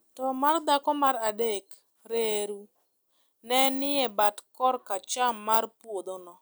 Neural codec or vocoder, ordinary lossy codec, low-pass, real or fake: none; none; none; real